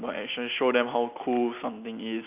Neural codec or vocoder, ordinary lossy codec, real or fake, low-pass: none; none; real; 3.6 kHz